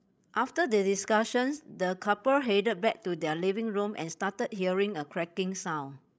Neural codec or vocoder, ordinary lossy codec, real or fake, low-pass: codec, 16 kHz, 16 kbps, FreqCodec, larger model; none; fake; none